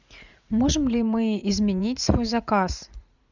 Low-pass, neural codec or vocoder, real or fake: 7.2 kHz; vocoder, 44.1 kHz, 80 mel bands, Vocos; fake